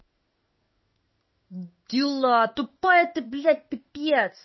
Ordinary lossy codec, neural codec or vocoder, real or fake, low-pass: MP3, 24 kbps; codec, 16 kHz in and 24 kHz out, 1 kbps, XY-Tokenizer; fake; 7.2 kHz